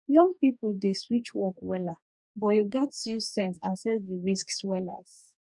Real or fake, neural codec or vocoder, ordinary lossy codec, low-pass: fake; codec, 44.1 kHz, 2.6 kbps, DAC; none; 10.8 kHz